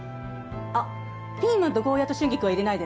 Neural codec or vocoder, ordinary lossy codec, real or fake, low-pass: none; none; real; none